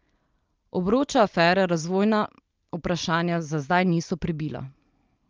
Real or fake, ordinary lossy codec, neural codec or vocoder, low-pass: real; Opus, 24 kbps; none; 7.2 kHz